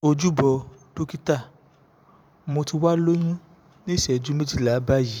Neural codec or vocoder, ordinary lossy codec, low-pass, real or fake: vocoder, 44.1 kHz, 128 mel bands every 512 samples, BigVGAN v2; none; 19.8 kHz; fake